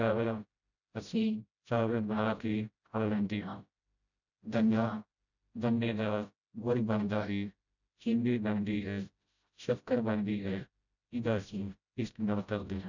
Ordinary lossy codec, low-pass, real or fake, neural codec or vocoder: none; 7.2 kHz; fake; codec, 16 kHz, 0.5 kbps, FreqCodec, smaller model